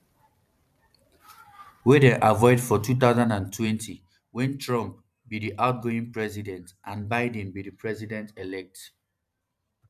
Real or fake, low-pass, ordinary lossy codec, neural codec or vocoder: fake; 14.4 kHz; none; vocoder, 44.1 kHz, 128 mel bands every 512 samples, BigVGAN v2